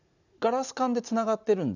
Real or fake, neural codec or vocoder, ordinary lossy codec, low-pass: real; none; none; 7.2 kHz